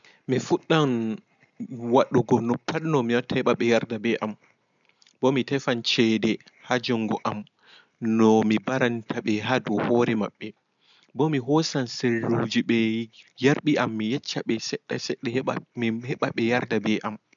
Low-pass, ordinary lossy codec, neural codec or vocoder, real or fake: 7.2 kHz; none; none; real